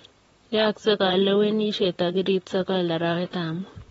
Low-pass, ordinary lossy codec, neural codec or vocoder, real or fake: 19.8 kHz; AAC, 24 kbps; vocoder, 44.1 kHz, 128 mel bands, Pupu-Vocoder; fake